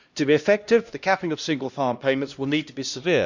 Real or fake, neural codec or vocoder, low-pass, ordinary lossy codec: fake; codec, 16 kHz, 1 kbps, X-Codec, HuBERT features, trained on LibriSpeech; 7.2 kHz; Opus, 64 kbps